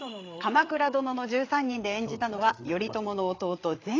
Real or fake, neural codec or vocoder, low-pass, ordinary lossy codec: fake; codec, 16 kHz, 8 kbps, FreqCodec, larger model; 7.2 kHz; AAC, 32 kbps